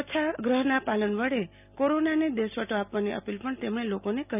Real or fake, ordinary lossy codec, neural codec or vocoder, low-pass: real; none; none; 3.6 kHz